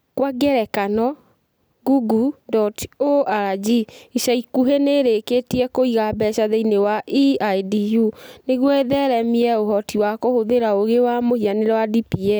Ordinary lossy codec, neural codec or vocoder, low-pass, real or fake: none; none; none; real